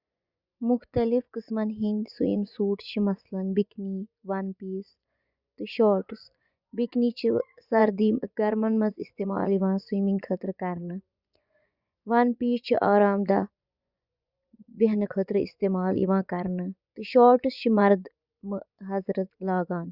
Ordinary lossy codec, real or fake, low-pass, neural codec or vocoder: Opus, 64 kbps; fake; 5.4 kHz; vocoder, 24 kHz, 100 mel bands, Vocos